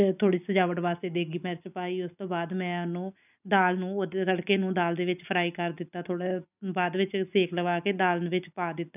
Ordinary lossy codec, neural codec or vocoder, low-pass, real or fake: none; none; 3.6 kHz; real